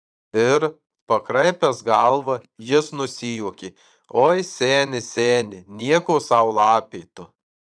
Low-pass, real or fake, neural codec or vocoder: 9.9 kHz; fake; vocoder, 22.05 kHz, 80 mel bands, Vocos